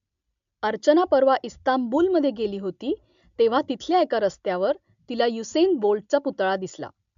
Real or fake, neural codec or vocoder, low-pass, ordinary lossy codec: real; none; 7.2 kHz; MP3, 64 kbps